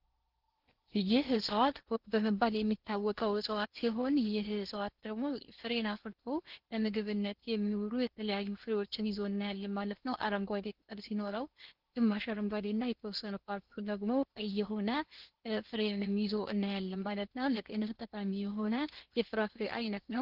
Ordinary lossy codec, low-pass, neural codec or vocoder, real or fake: Opus, 16 kbps; 5.4 kHz; codec, 16 kHz in and 24 kHz out, 0.8 kbps, FocalCodec, streaming, 65536 codes; fake